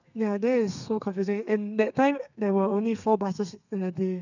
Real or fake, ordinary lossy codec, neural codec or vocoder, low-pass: fake; none; codec, 32 kHz, 1.9 kbps, SNAC; 7.2 kHz